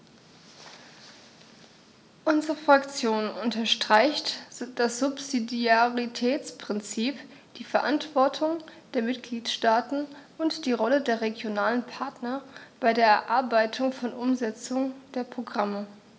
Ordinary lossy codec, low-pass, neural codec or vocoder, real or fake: none; none; none; real